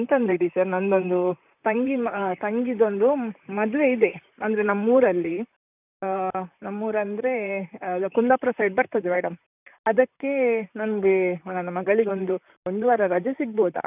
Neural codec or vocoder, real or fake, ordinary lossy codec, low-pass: vocoder, 44.1 kHz, 128 mel bands, Pupu-Vocoder; fake; none; 3.6 kHz